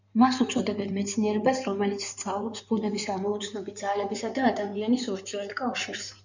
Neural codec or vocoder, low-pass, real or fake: codec, 16 kHz in and 24 kHz out, 2.2 kbps, FireRedTTS-2 codec; 7.2 kHz; fake